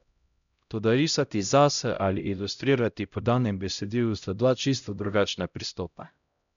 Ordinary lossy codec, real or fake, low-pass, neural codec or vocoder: none; fake; 7.2 kHz; codec, 16 kHz, 0.5 kbps, X-Codec, HuBERT features, trained on LibriSpeech